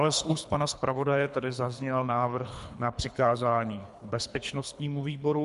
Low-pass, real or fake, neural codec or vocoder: 10.8 kHz; fake; codec, 24 kHz, 3 kbps, HILCodec